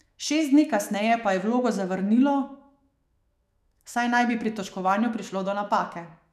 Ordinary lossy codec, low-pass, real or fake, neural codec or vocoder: none; 14.4 kHz; fake; autoencoder, 48 kHz, 128 numbers a frame, DAC-VAE, trained on Japanese speech